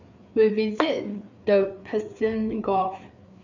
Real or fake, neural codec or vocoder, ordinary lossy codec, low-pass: fake; codec, 16 kHz, 4 kbps, FreqCodec, larger model; none; 7.2 kHz